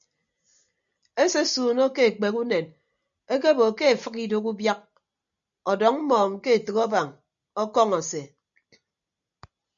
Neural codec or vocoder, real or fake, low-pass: none; real; 7.2 kHz